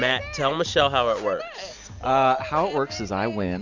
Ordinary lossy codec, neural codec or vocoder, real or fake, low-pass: MP3, 64 kbps; none; real; 7.2 kHz